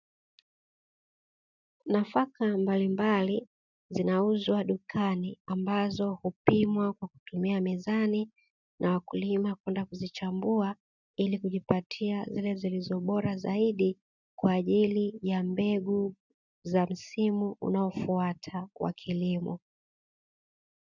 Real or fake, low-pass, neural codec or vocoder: real; 7.2 kHz; none